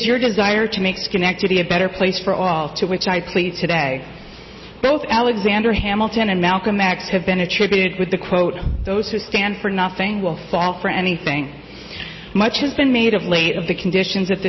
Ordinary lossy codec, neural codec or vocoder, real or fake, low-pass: MP3, 24 kbps; none; real; 7.2 kHz